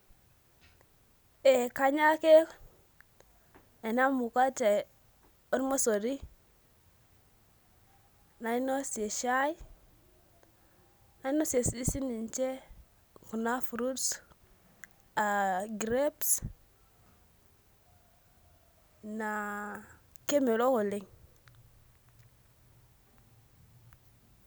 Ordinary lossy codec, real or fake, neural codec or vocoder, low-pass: none; real; none; none